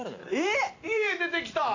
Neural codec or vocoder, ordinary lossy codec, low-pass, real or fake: vocoder, 44.1 kHz, 128 mel bands every 512 samples, BigVGAN v2; AAC, 48 kbps; 7.2 kHz; fake